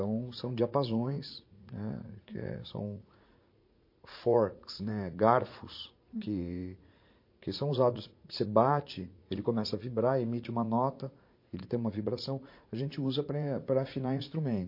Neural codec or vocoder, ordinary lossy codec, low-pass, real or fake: vocoder, 44.1 kHz, 80 mel bands, Vocos; MP3, 32 kbps; 5.4 kHz; fake